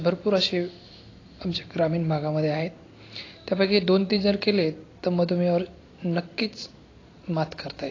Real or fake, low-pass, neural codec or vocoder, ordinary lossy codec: real; 7.2 kHz; none; AAC, 32 kbps